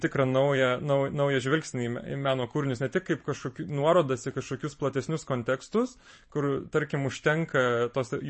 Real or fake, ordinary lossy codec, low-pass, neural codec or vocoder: fake; MP3, 32 kbps; 10.8 kHz; vocoder, 44.1 kHz, 128 mel bands every 512 samples, BigVGAN v2